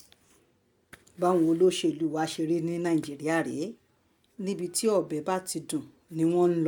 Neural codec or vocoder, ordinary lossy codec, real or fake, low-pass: none; none; real; 19.8 kHz